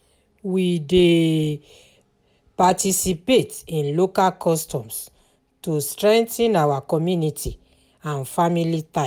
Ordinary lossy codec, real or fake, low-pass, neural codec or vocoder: none; real; none; none